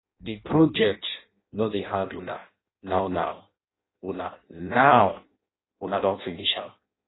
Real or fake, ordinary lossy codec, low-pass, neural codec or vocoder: fake; AAC, 16 kbps; 7.2 kHz; codec, 16 kHz in and 24 kHz out, 0.6 kbps, FireRedTTS-2 codec